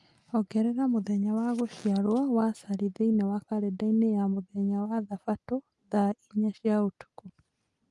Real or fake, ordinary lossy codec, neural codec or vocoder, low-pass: real; Opus, 32 kbps; none; 10.8 kHz